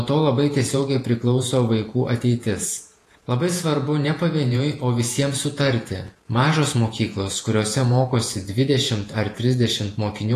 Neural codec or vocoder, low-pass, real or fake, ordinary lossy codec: vocoder, 48 kHz, 128 mel bands, Vocos; 14.4 kHz; fake; AAC, 48 kbps